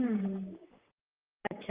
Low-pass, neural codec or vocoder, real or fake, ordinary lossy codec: 3.6 kHz; vocoder, 44.1 kHz, 128 mel bands, Pupu-Vocoder; fake; Opus, 24 kbps